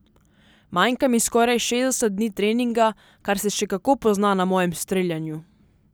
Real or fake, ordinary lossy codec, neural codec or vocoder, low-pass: real; none; none; none